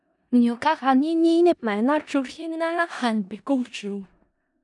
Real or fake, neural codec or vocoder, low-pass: fake; codec, 16 kHz in and 24 kHz out, 0.4 kbps, LongCat-Audio-Codec, four codebook decoder; 10.8 kHz